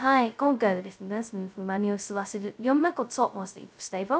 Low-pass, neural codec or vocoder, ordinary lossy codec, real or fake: none; codec, 16 kHz, 0.2 kbps, FocalCodec; none; fake